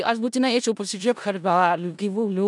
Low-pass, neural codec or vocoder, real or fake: 10.8 kHz; codec, 16 kHz in and 24 kHz out, 0.4 kbps, LongCat-Audio-Codec, four codebook decoder; fake